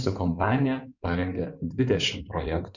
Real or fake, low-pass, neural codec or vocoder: fake; 7.2 kHz; codec, 16 kHz in and 24 kHz out, 2.2 kbps, FireRedTTS-2 codec